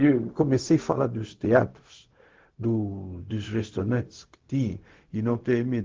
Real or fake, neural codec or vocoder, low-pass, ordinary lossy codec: fake; codec, 16 kHz, 0.4 kbps, LongCat-Audio-Codec; 7.2 kHz; Opus, 16 kbps